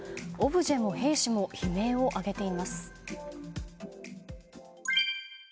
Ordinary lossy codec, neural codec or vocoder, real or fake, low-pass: none; none; real; none